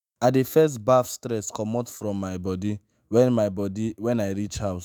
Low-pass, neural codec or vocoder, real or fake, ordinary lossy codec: none; autoencoder, 48 kHz, 128 numbers a frame, DAC-VAE, trained on Japanese speech; fake; none